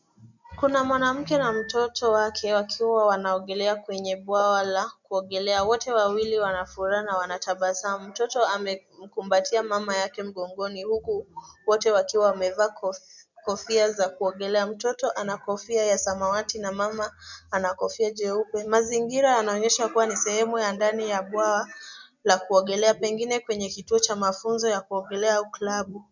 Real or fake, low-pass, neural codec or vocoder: real; 7.2 kHz; none